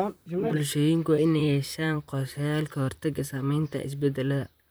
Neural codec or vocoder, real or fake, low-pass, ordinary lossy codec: vocoder, 44.1 kHz, 128 mel bands, Pupu-Vocoder; fake; none; none